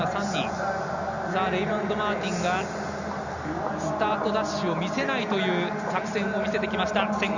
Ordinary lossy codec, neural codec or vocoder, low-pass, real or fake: Opus, 64 kbps; none; 7.2 kHz; real